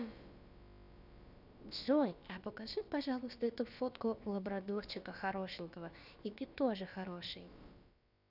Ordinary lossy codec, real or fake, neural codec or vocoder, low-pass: none; fake; codec, 16 kHz, about 1 kbps, DyCAST, with the encoder's durations; 5.4 kHz